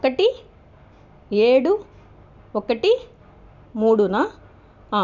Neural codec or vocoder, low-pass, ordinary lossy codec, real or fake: none; 7.2 kHz; none; real